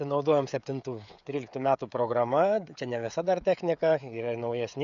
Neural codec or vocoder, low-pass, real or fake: codec, 16 kHz, 16 kbps, FreqCodec, smaller model; 7.2 kHz; fake